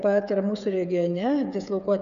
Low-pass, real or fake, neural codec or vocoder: 7.2 kHz; fake; codec, 16 kHz, 8 kbps, FreqCodec, smaller model